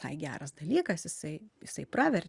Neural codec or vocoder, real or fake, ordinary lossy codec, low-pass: none; real; Opus, 64 kbps; 10.8 kHz